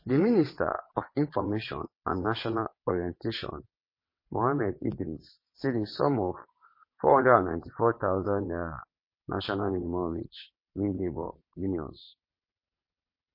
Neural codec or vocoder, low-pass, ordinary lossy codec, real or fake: vocoder, 22.05 kHz, 80 mel bands, WaveNeXt; 5.4 kHz; MP3, 24 kbps; fake